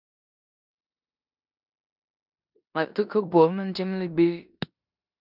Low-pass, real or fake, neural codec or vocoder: 5.4 kHz; fake; codec, 16 kHz in and 24 kHz out, 0.9 kbps, LongCat-Audio-Codec, four codebook decoder